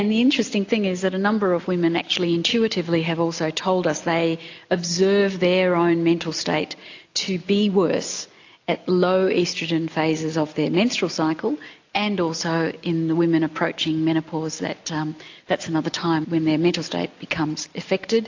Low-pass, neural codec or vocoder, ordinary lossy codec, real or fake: 7.2 kHz; none; AAC, 48 kbps; real